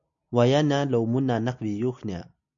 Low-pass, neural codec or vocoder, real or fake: 7.2 kHz; none; real